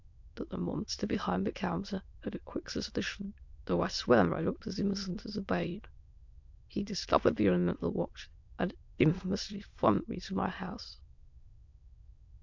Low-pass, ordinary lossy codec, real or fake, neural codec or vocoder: 7.2 kHz; MP3, 64 kbps; fake; autoencoder, 22.05 kHz, a latent of 192 numbers a frame, VITS, trained on many speakers